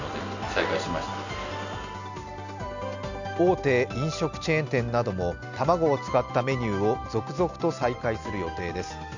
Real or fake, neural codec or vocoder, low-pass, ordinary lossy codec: real; none; 7.2 kHz; none